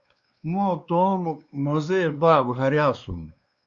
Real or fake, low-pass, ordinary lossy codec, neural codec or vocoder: fake; 7.2 kHz; Opus, 64 kbps; codec, 16 kHz, 2 kbps, X-Codec, WavLM features, trained on Multilingual LibriSpeech